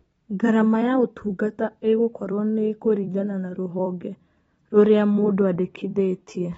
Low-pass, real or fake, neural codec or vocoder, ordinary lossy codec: 19.8 kHz; fake; vocoder, 44.1 kHz, 128 mel bands every 256 samples, BigVGAN v2; AAC, 24 kbps